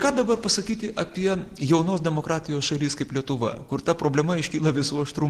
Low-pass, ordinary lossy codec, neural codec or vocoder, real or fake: 14.4 kHz; Opus, 16 kbps; none; real